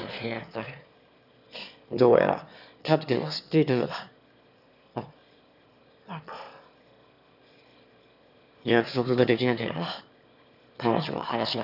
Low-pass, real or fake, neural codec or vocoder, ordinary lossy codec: 5.4 kHz; fake; autoencoder, 22.05 kHz, a latent of 192 numbers a frame, VITS, trained on one speaker; none